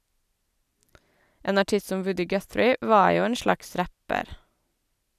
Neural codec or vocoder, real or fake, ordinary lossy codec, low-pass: none; real; none; 14.4 kHz